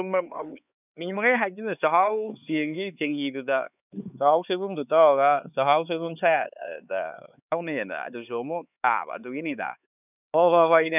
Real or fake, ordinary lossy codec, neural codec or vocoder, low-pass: fake; none; codec, 16 kHz, 4 kbps, X-Codec, HuBERT features, trained on LibriSpeech; 3.6 kHz